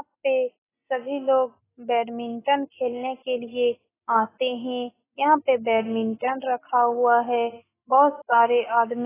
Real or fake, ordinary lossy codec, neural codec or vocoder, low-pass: real; AAC, 16 kbps; none; 3.6 kHz